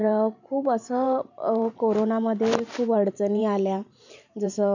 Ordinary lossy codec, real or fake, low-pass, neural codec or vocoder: none; fake; 7.2 kHz; vocoder, 44.1 kHz, 128 mel bands every 512 samples, BigVGAN v2